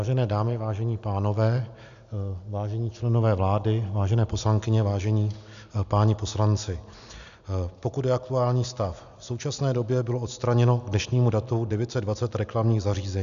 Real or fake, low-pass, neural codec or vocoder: real; 7.2 kHz; none